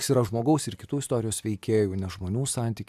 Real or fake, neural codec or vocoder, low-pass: real; none; 14.4 kHz